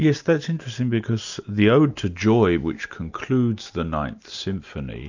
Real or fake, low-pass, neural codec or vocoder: real; 7.2 kHz; none